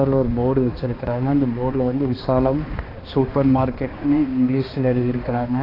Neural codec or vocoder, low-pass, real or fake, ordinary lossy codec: codec, 16 kHz, 2 kbps, X-Codec, HuBERT features, trained on balanced general audio; 5.4 kHz; fake; AAC, 24 kbps